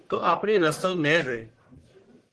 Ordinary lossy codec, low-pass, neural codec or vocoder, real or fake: Opus, 16 kbps; 10.8 kHz; codec, 44.1 kHz, 1.7 kbps, Pupu-Codec; fake